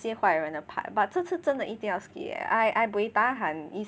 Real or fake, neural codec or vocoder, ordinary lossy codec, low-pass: real; none; none; none